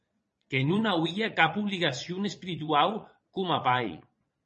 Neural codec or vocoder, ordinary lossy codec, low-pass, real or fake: vocoder, 22.05 kHz, 80 mel bands, Vocos; MP3, 32 kbps; 9.9 kHz; fake